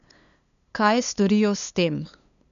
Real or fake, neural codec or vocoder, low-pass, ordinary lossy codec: fake; codec, 16 kHz, 2 kbps, FunCodec, trained on LibriTTS, 25 frames a second; 7.2 kHz; none